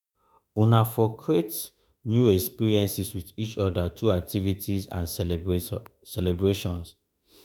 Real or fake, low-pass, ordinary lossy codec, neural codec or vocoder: fake; none; none; autoencoder, 48 kHz, 32 numbers a frame, DAC-VAE, trained on Japanese speech